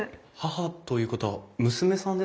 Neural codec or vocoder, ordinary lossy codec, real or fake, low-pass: none; none; real; none